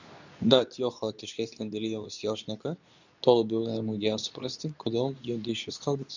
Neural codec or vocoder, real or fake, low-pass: codec, 24 kHz, 0.9 kbps, WavTokenizer, medium speech release version 2; fake; 7.2 kHz